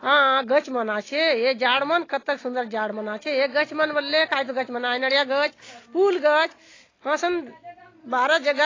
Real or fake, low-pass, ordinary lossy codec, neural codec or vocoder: real; 7.2 kHz; AAC, 32 kbps; none